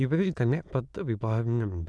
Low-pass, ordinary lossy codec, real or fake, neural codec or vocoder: none; none; fake; autoencoder, 22.05 kHz, a latent of 192 numbers a frame, VITS, trained on many speakers